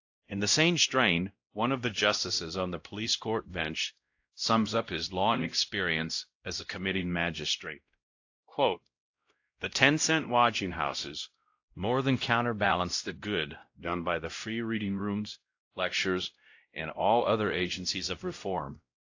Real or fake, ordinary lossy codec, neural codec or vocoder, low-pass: fake; AAC, 48 kbps; codec, 16 kHz, 0.5 kbps, X-Codec, WavLM features, trained on Multilingual LibriSpeech; 7.2 kHz